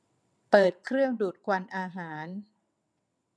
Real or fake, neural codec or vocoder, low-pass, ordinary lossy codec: fake; vocoder, 22.05 kHz, 80 mel bands, WaveNeXt; none; none